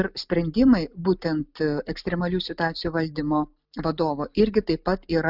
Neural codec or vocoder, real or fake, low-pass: none; real; 5.4 kHz